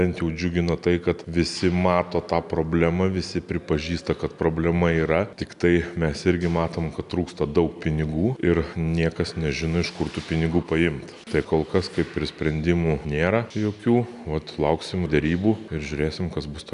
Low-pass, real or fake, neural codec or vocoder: 10.8 kHz; real; none